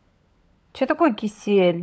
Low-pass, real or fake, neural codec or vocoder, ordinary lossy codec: none; fake; codec, 16 kHz, 16 kbps, FunCodec, trained on LibriTTS, 50 frames a second; none